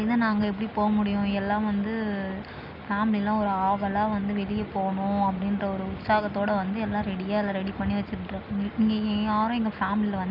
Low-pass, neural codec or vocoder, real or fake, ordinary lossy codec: 5.4 kHz; none; real; none